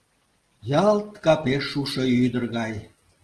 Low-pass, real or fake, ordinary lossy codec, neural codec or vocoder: 10.8 kHz; real; Opus, 16 kbps; none